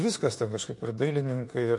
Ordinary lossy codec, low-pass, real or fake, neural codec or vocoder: MP3, 48 kbps; 10.8 kHz; fake; autoencoder, 48 kHz, 32 numbers a frame, DAC-VAE, trained on Japanese speech